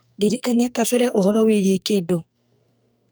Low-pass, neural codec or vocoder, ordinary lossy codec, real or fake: none; codec, 44.1 kHz, 2.6 kbps, SNAC; none; fake